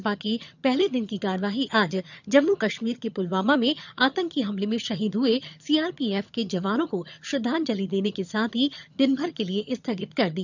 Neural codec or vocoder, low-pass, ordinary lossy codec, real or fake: vocoder, 22.05 kHz, 80 mel bands, HiFi-GAN; 7.2 kHz; none; fake